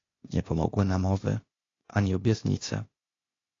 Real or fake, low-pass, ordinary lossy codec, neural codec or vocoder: fake; 7.2 kHz; AAC, 32 kbps; codec, 16 kHz, 0.8 kbps, ZipCodec